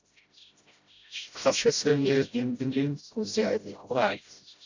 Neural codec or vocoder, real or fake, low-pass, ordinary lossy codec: codec, 16 kHz, 0.5 kbps, FreqCodec, smaller model; fake; 7.2 kHz; AAC, 48 kbps